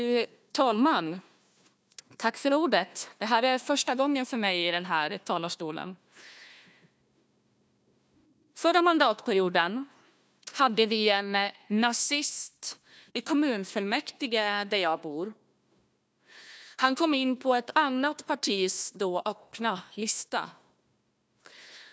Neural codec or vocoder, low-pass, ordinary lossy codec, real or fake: codec, 16 kHz, 1 kbps, FunCodec, trained on Chinese and English, 50 frames a second; none; none; fake